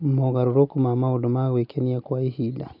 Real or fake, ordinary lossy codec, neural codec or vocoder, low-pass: real; none; none; 5.4 kHz